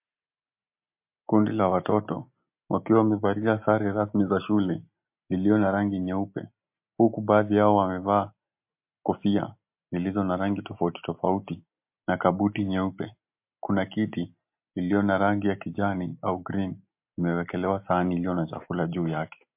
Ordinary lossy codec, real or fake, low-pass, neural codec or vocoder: MP3, 32 kbps; real; 3.6 kHz; none